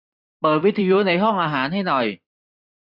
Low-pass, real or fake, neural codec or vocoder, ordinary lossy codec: 5.4 kHz; fake; vocoder, 44.1 kHz, 128 mel bands every 256 samples, BigVGAN v2; none